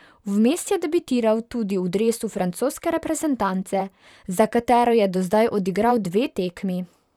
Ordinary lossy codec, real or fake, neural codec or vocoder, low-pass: none; fake; vocoder, 44.1 kHz, 128 mel bands, Pupu-Vocoder; 19.8 kHz